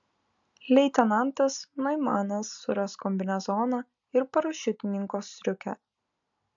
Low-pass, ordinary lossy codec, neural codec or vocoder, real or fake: 7.2 kHz; AAC, 64 kbps; none; real